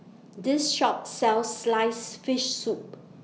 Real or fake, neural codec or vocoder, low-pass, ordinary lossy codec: real; none; none; none